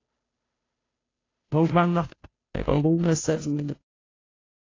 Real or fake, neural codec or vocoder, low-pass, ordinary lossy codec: fake; codec, 16 kHz, 0.5 kbps, FunCodec, trained on Chinese and English, 25 frames a second; 7.2 kHz; AAC, 32 kbps